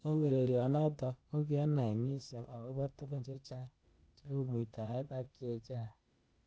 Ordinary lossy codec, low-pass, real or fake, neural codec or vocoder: none; none; fake; codec, 16 kHz, 0.8 kbps, ZipCodec